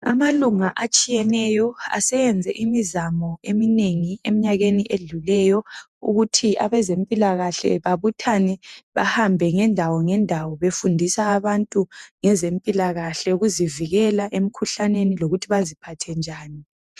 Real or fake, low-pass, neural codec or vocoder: fake; 14.4 kHz; vocoder, 48 kHz, 128 mel bands, Vocos